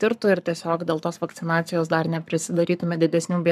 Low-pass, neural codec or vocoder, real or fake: 14.4 kHz; codec, 44.1 kHz, 7.8 kbps, Pupu-Codec; fake